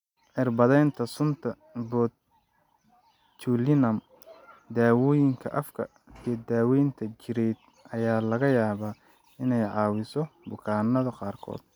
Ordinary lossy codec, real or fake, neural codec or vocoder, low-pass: none; real; none; 19.8 kHz